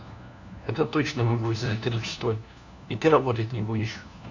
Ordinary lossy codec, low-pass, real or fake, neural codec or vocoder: none; 7.2 kHz; fake; codec, 16 kHz, 1 kbps, FunCodec, trained on LibriTTS, 50 frames a second